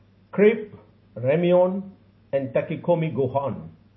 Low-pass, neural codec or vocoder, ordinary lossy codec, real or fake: 7.2 kHz; none; MP3, 24 kbps; real